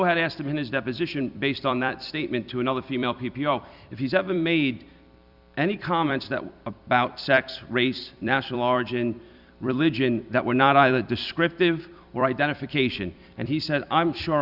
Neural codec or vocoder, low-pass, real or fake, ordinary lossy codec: vocoder, 44.1 kHz, 128 mel bands every 256 samples, BigVGAN v2; 5.4 kHz; fake; Opus, 64 kbps